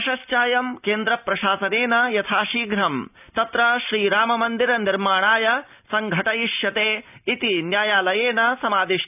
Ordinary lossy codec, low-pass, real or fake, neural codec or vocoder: none; 3.6 kHz; real; none